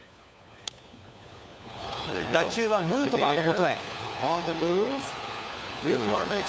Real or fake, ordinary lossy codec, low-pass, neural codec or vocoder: fake; none; none; codec, 16 kHz, 4 kbps, FunCodec, trained on LibriTTS, 50 frames a second